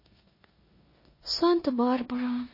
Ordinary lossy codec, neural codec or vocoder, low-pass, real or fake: MP3, 24 kbps; codec, 16 kHz in and 24 kHz out, 0.9 kbps, LongCat-Audio-Codec, four codebook decoder; 5.4 kHz; fake